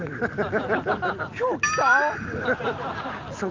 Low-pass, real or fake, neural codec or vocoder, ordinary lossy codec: 7.2 kHz; real; none; Opus, 32 kbps